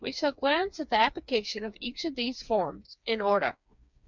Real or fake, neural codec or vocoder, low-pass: fake; codec, 16 kHz, 4 kbps, FreqCodec, smaller model; 7.2 kHz